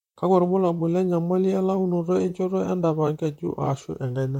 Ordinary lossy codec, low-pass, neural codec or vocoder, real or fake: MP3, 64 kbps; 19.8 kHz; vocoder, 44.1 kHz, 128 mel bands, Pupu-Vocoder; fake